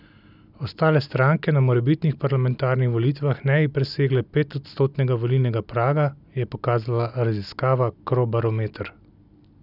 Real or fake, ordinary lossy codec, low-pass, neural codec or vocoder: real; none; 5.4 kHz; none